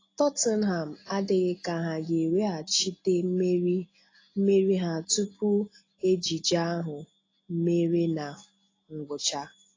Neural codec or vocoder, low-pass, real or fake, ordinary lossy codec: none; 7.2 kHz; real; AAC, 32 kbps